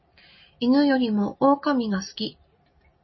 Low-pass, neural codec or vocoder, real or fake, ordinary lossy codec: 7.2 kHz; none; real; MP3, 24 kbps